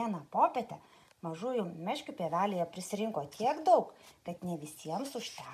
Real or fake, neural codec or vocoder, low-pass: real; none; 14.4 kHz